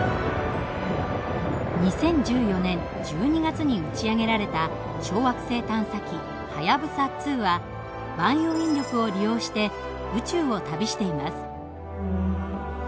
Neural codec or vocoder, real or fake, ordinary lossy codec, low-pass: none; real; none; none